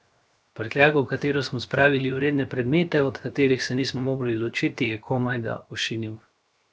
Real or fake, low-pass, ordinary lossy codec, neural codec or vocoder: fake; none; none; codec, 16 kHz, 0.7 kbps, FocalCodec